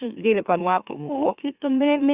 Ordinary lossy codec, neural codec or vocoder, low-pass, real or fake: Opus, 64 kbps; autoencoder, 44.1 kHz, a latent of 192 numbers a frame, MeloTTS; 3.6 kHz; fake